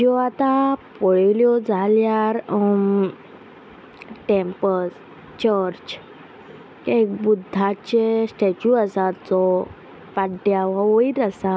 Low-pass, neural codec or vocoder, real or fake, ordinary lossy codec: none; none; real; none